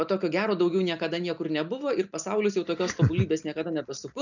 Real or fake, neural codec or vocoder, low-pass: real; none; 7.2 kHz